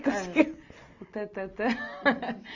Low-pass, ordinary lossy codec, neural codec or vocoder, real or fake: 7.2 kHz; AAC, 48 kbps; none; real